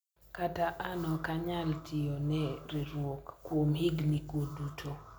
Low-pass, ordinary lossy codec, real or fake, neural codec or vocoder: none; none; real; none